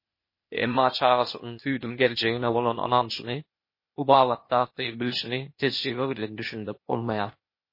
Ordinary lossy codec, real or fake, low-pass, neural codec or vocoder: MP3, 24 kbps; fake; 5.4 kHz; codec, 16 kHz, 0.8 kbps, ZipCodec